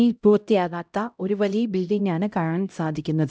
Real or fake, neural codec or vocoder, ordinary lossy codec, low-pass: fake; codec, 16 kHz, 0.5 kbps, X-Codec, HuBERT features, trained on LibriSpeech; none; none